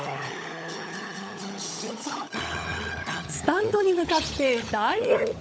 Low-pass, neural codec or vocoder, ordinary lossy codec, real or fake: none; codec, 16 kHz, 16 kbps, FunCodec, trained on LibriTTS, 50 frames a second; none; fake